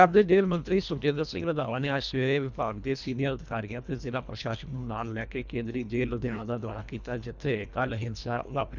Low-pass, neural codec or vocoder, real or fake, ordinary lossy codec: 7.2 kHz; codec, 24 kHz, 1.5 kbps, HILCodec; fake; none